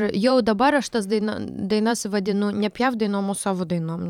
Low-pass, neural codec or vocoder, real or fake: 19.8 kHz; vocoder, 44.1 kHz, 128 mel bands every 512 samples, BigVGAN v2; fake